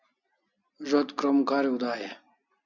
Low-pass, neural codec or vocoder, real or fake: 7.2 kHz; none; real